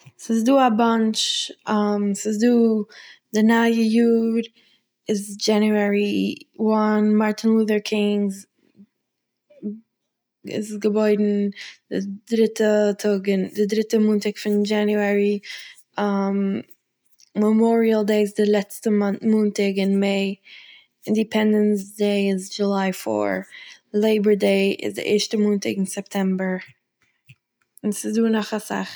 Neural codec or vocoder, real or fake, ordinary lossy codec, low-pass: none; real; none; none